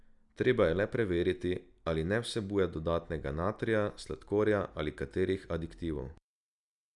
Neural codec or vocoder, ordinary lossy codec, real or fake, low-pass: none; none; real; 10.8 kHz